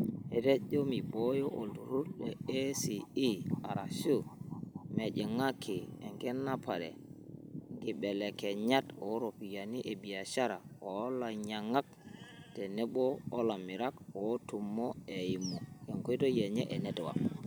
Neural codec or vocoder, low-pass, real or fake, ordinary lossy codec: none; none; real; none